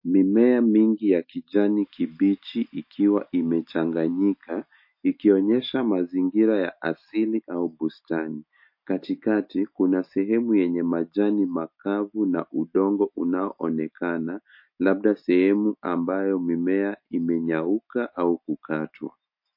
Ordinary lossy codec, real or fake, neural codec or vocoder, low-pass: MP3, 32 kbps; real; none; 5.4 kHz